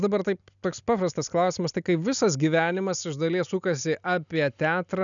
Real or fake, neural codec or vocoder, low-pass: real; none; 7.2 kHz